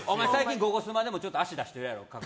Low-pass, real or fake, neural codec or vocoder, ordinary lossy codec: none; real; none; none